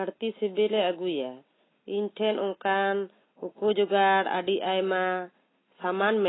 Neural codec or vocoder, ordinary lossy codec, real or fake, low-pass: none; AAC, 16 kbps; real; 7.2 kHz